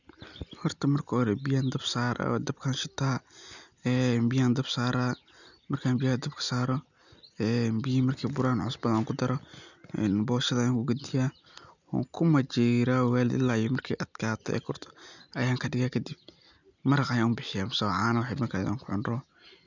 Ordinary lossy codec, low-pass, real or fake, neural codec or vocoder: none; 7.2 kHz; real; none